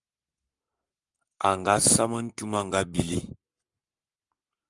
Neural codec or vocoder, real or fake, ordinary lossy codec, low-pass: codec, 44.1 kHz, 7.8 kbps, Pupu-Codec; fake; Opus, 32 kbps; 10.8 kHz